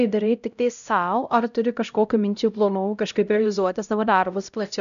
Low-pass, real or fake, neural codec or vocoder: 7.2 kHz; fake; codec, 16 kHz, 0.5 kbps, X-Codec, HuBERT features, trained on LibriSpeech